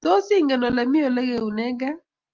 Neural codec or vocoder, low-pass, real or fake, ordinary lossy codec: none; 7.2 kHz; real; Opus, 32 kbps